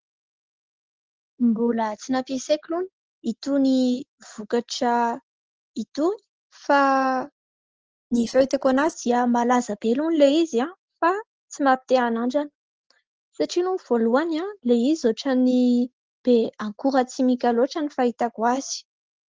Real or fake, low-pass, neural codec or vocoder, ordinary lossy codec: real; 7.2 kHz; none; Opus, 16 kbps